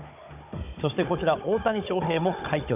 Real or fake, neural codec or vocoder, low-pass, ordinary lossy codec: fake; codec, 16 kHz, 16 kbps, FunCodec, trained on Chinese and English, 50 frames a second; 3.6 kHz; none